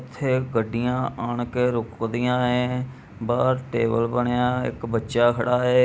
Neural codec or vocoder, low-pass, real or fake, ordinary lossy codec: none; none; real; none